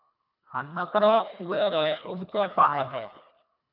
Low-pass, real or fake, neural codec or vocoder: 5.4 kHz; fake; codec, 24 kHz, 1.5 kbps, HILCodec